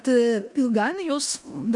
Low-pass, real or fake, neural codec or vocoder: 10.8 kHz; fake; codec, 16 kHz in and 24 kHz out, 0.9 kbps, LongCat-Audio-Codec, four codebook decoder